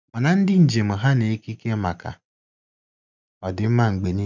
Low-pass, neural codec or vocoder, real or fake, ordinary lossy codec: 7.2 kHz; none; real; none